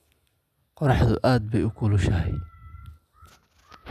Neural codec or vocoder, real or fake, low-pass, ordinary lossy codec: none; real; 14.4 kHz; none